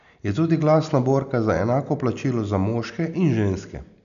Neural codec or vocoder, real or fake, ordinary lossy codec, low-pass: none; real; none; 7.2 kHz